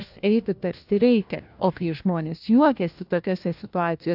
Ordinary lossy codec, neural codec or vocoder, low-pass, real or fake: AAC, 48 kbps; codec, 16 kHz, 1 kbps, FunCodec, trained on LibriTTS, 50 frames a second; 5.4 kHz; fake